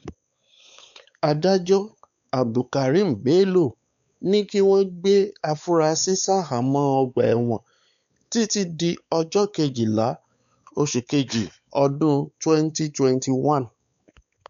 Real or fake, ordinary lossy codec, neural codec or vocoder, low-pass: fake; none; codec, 16 kHz, 4 kbps, X-Codec, WavLM features, trained on Multilingual LibriSpeech; 7.2 kHz